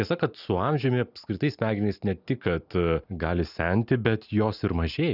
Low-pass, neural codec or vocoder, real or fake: 5.4 kHz; none; real